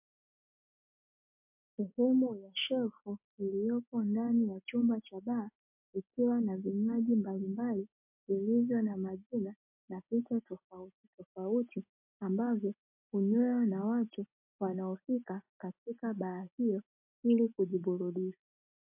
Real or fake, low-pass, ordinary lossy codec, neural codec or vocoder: real; 3.6 kHz; MP3, 32 kbps; none